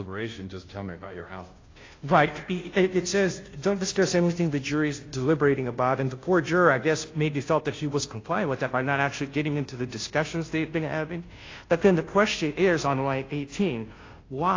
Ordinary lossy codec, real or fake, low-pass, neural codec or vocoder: AAC, 32 kbps; fake; 7.2 kHz; codec, 16 kHz, 0.5 kbps, FunCodec, trained on Chinese and English, 25 frames a second